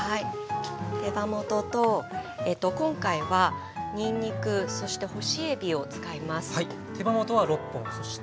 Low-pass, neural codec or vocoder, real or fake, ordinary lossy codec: none; none; real; none